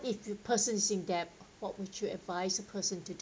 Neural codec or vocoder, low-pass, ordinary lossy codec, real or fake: none; none; none; real